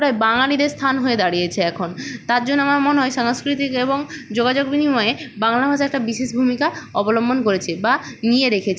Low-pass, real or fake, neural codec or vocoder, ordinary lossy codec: none; real; none; none